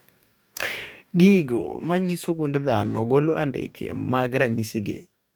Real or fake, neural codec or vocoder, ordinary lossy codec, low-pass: fake; codec, 44.1 kHz, 2.6 kbps, DAC; none; none